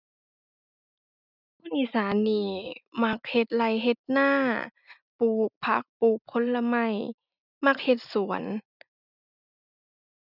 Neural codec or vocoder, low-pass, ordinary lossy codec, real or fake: none; 5.4 kHz; none; real